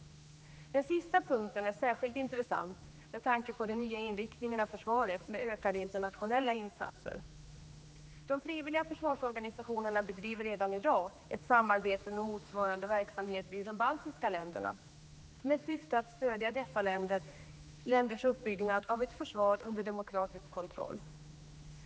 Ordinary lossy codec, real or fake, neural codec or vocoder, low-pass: none; fake; codec, 16 kHz, 2 kbps, X-Codec, HuBERT features, trained on general audio; none